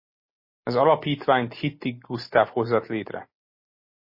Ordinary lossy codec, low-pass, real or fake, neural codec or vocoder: MP3, 24 kbps; 5.4 kHz; real; none